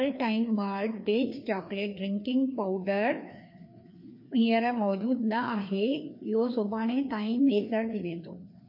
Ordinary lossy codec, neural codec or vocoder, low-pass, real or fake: MP3, 32 kbps; codec, 16 kHz, 2 kbps, FreqCodec, larger model; 5.4 kHz; fake